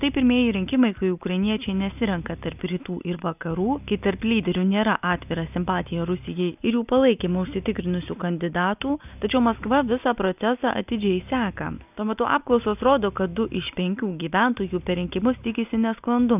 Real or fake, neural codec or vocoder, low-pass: real; none; 3.6 kHz